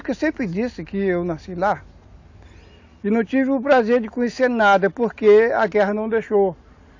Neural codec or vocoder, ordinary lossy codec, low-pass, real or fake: none; AAC, 48 kbps; 7.2 kHz; real